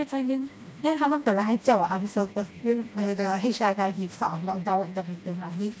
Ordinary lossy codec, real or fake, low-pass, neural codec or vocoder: none; fake; none; codec, 16 kHz, 1 kbps, FreqCodec, smaller model